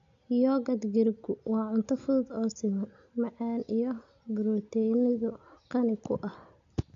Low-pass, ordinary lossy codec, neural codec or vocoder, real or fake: 7.2 kHz; AAC, 64 kbps; none; real